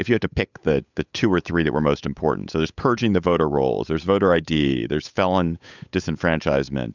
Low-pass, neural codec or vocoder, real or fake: 7.2 kHz; codec, 16 kHz, 8 kbps, FunCodec, trained on Chinese and English, 25 frames a second; fake